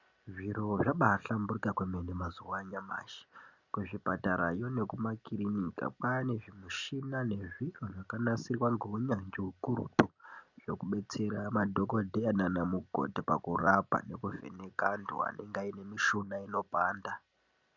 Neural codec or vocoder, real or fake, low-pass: none; real; 7.2 kHz